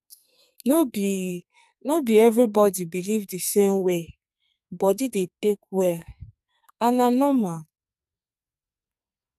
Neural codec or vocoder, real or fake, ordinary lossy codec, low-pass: codec, 44.1 kHz, 2.6 kbps, SNAC; fake; none; 14.4 kHz